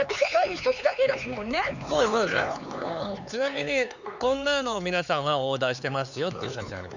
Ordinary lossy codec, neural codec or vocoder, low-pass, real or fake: none; codec, 16 kHz, 4 kbps, X-Codec, HuBERT features, trained on LibriSpeech; 7.2 kHz; fake